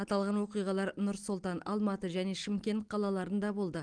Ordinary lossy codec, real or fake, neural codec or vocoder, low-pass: Opus, 24 kbps; real; none; 9.9 kHz